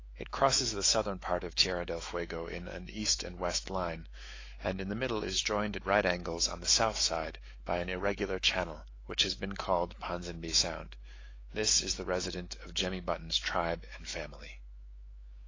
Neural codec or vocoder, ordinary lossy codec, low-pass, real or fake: autoencoder, 48 kHz, 128 numbers a frame, DAC-VAE, trained on Japanese speech; AAC, 32 kbps; 7.2 kHz; fake